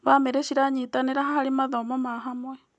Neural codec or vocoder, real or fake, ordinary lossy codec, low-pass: none; real; none; 10.8 kHz